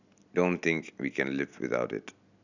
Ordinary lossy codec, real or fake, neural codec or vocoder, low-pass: none; real; none; 7.2 kHz